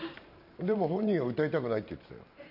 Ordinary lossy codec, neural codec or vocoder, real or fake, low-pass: none; vocoder, 44.1 kHz, 128 mel bands every 512 samples, BigVGAN v2; fake; 5.4 kHz